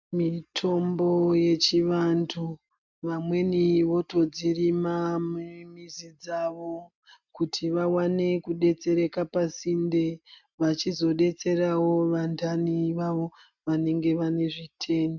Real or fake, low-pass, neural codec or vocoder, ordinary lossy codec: real; 7.2 kHz; none; MP3, 64 kbps